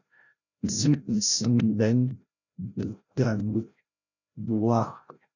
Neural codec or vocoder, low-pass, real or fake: codec, 16 kHz, 0.5 kbps, FreqCodec, larger model; 7.2 kHz; fake